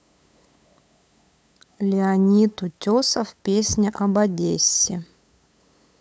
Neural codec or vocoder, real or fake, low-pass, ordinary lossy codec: codec, 16 kHz, 8 kbps, FunCodec, trained on LibriTTS, 25 frames a second; fake; none; none